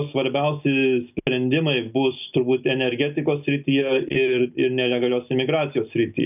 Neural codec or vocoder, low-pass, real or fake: none; 3.6 kHz; real